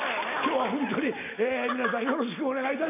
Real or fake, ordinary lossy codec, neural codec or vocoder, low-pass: real; none; none; 3.6 kHz